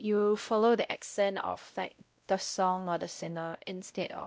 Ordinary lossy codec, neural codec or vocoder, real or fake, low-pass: none; codec, 16 kHz, 0.5 kbps, X-Codec, WavLM features, trained on Multilingual LibriSpeech; fake; none